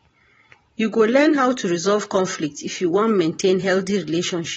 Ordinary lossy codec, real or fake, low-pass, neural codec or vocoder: AAC, 24 kbps; fake; 19.8 kHz; vocoder, 44.1 kHz, 128 mel bands every 512 samples, BigVGAN v2